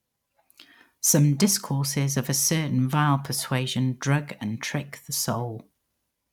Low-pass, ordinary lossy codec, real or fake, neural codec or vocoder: 19.8 kHz; none; real; none